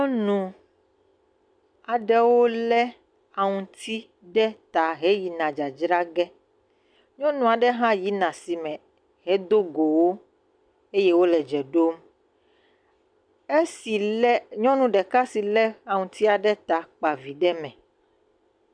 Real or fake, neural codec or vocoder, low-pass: real; none; 9.9 kHz